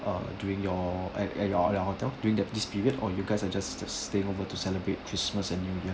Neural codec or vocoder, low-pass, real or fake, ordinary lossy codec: none; none; real; none